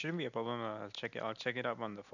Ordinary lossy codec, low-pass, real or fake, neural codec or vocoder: none; 7.2 kHz; real; none